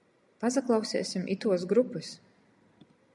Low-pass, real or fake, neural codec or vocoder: 10.8 kHz; real; none